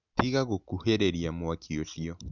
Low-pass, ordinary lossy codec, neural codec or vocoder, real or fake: 7.2 kHz; none; none; real